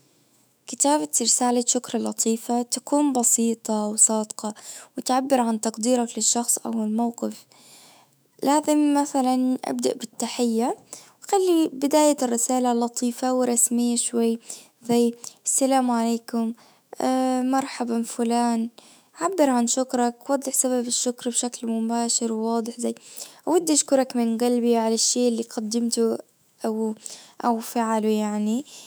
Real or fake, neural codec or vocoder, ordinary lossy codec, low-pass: fake; autoencoder, 48 kHz, 128 numbers a frame, DAC-VAE, trained on Japanese speech; none; none